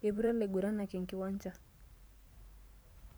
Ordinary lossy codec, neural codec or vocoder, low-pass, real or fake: none; vocoder, 44.1 kHz, 128 mel bands every 512 samples, BigVGAN v2; none; fake